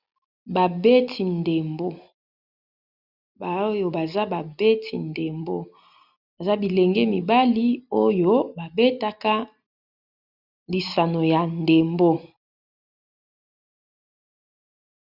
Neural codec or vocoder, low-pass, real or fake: none; 5.4 kHz; real